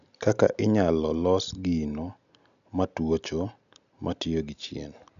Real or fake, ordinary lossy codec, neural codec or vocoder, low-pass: real; none; none; 7.2 kHz